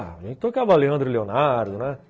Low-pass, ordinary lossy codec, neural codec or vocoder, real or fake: none; none; none; real